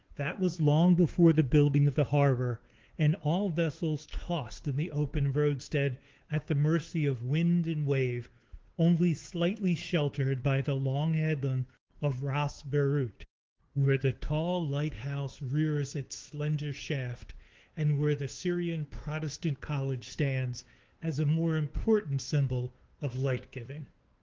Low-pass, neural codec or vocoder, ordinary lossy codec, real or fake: 7.2 kHz; codec, 16 kHz, 2 kbps, FunCodec, trained on Chinese and English, 25 frames a second; Opus, 16 kbps; fake